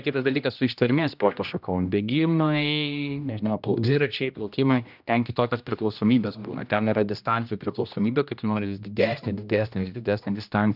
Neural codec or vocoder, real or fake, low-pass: codec, 16 kHz, 1 kbps, X-Codec, HuBERT features, trained on general audio; fake; 5.4 kHz